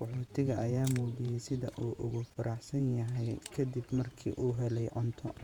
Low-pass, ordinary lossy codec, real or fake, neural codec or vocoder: 19.8 kHz; none; fake; vocoder, 48 kHz, 128 mel bands, Vocos